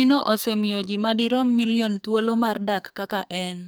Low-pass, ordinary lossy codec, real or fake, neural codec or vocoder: none; none; fake; codec, 44.1 kHz, 2.6 kbps, SNAC